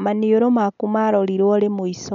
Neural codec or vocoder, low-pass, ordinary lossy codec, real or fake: none; 7.2 kHz; none; real